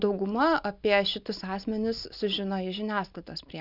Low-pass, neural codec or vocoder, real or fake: 5.4 kHz; none; real